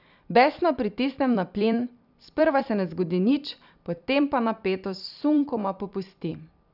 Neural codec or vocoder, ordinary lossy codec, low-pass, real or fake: vocoder, 44.1 kHz, 128 mel bands every 256 samples, BigVGAN v2; none; 5.4 kHz; fake